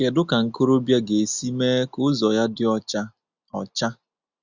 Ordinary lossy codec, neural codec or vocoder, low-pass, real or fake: Opus, 64 kbps; none; 7.2 kHz; real